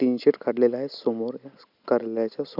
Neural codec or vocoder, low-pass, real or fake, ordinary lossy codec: none; 5.4 kHz; real; none